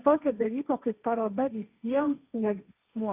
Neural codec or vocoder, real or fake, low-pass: codec, 16 kHz, 1.1 kbps, Voila-Tokenizer; fake; 3.6 kHz